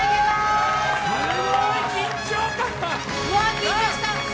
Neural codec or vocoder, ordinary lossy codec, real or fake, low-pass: none; none; real; none